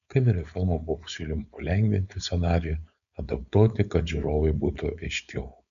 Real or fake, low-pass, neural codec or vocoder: fake; 7.2 kHz; codec, 16 kHz, 4.8 kbps, FACodec